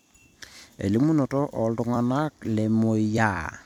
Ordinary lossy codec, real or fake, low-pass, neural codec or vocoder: none; fake; 19.8 kHz; vocoder, 44.1 kHz, 128 mel bands every 256 samples, BigVGAN v2